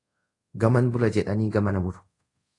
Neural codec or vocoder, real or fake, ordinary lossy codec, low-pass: codec, 24 kHz, 0.5 kbps, DualCodec; fake; AAC, 48 kbps; 10.8 kHz